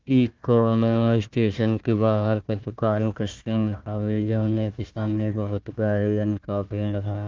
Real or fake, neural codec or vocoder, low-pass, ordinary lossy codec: fake; codec, 16 kHz, 1 kbps, FunCodec, trained on Chinese and English, 50 frames a second; 7.2 kHz; Opus, 32 kbps